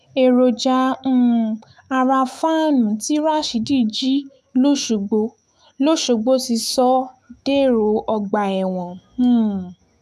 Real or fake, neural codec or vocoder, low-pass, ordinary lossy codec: fake; autoencoder, 48 kHz, 128 numbers a frame, DAC-VAE, trained on Japanese speech; 14.4 kHz; none